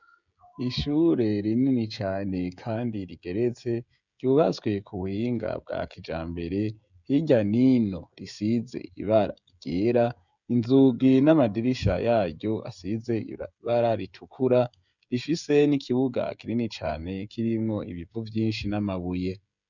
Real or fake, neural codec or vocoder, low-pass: fake; codec, 16 kHz, 16 kbps, FreqCodec, smaller model; 7.2 kHz